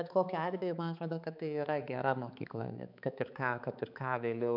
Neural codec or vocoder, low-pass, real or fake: codec, 16 kHz, 4 kbps, X-Codec, HuBERT features, trained on balanced general audio; 5.4 kHz; fake